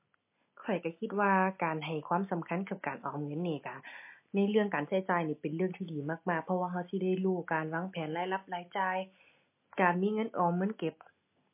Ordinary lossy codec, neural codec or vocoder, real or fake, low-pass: MP3, 24 kbps; none; real; 3.6 kHz